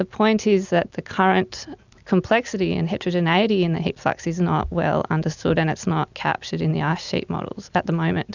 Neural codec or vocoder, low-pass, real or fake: none; 7.2 kHz; real